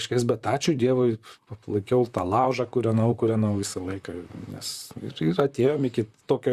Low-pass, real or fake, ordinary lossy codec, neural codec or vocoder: 14.4 kHz; fake; Opus, 64 kbps; vocoder, 44.1 kHz, 128 mel bands, Pupu-Vocoder